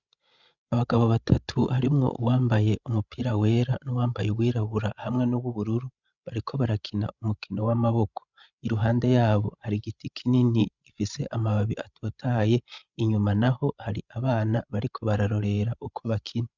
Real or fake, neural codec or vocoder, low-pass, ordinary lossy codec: fake; codec, 16 kHz, 16 kbps, FreqCodec, larger model; 7.2 kHz; Opus, 64 kbps